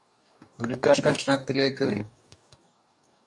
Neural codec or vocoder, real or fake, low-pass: codec, 44.1 kHz, 2.6 kbps, DAC; fake; 10.8 kHz